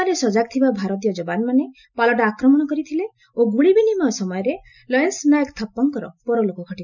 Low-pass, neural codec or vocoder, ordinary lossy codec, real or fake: 7.2 kHz; none; none; real